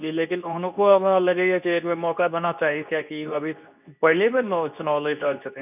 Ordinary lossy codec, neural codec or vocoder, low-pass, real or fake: none; codec, 24 kHz, 0.9 kbps, WavTokenizer, medium speech release version 2; 3.6 kHz; fake